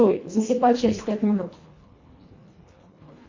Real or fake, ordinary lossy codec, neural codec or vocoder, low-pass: fake; MP3, 48 kbps; codec, 24 kHz, 1.5 kbps, HILCodec; 7.2 kHz